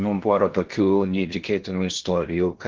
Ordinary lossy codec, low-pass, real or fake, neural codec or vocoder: Opus, 16 kbps; 7.2 kHz; fake; codec, 16 kHz in and 24 kHz out, 0.6 kbps, FocalCodec, streaming, 4096 codes